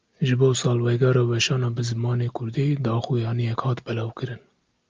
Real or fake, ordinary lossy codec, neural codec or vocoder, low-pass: real; Opus, 24 kbps; none; 7.2 kHz